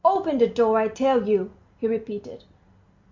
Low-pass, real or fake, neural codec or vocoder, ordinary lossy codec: 7.2 kHz; real; none; MP3, 48 kbps